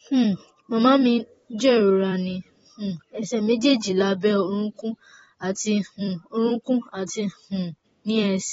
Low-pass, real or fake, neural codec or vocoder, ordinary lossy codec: 19.8 kHz; fake; vocoder, 44.1 kHz, 128 mel bands every 256 samples, BigVGAN v2; AAC, 24 kbps